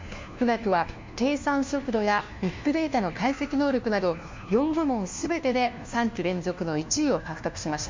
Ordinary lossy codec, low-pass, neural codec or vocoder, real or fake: MP3, 64 kbps; 7.2 kHz; codec, 16 kHz, 1 kbps, FunCodec, trained on LibriTTS, 50 frames a second; fake